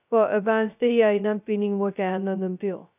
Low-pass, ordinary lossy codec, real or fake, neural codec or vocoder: 3.6 kHz; none; fake; codec, 16 kHz, 0.2 kbps, FocalCodec